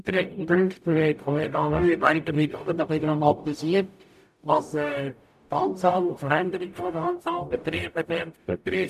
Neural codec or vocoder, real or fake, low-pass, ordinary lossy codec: codec, 44.1 kHz, 0.9 kbps, DAC; fake; 14.4 kHz; none